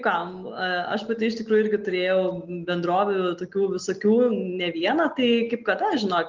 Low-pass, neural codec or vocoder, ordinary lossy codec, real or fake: 7.2 kHz; none; Opus, 24 kbps; real